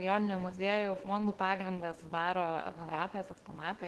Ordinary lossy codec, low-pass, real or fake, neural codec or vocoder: Opus, 16 kbps; 10.8 kHz; fake; codec, 24 kHz, 0.9 kbps, WavTokenizer, small release